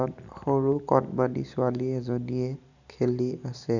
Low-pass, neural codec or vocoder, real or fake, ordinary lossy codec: 7.2 kHz; none; real; none